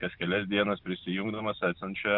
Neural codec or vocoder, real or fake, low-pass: none; real; 5.4 kHz